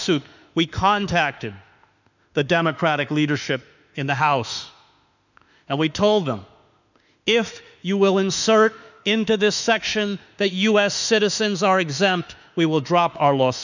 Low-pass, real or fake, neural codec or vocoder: 7.2 kHz; fake; autoencoder, 48 kHz, 32 numbers a frame, DAC-VAE, trained on Japanese speech